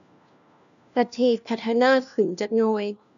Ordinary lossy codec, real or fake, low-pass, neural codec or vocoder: none; fake; 7.2 kHz; codec, 16 kHz, 1 kbps, FunCodec, trained on LibriTTS, 50 frames a second